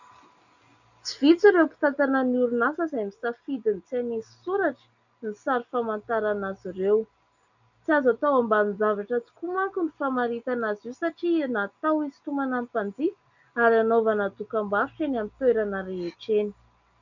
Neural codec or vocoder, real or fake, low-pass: none; real; 7.2 kHz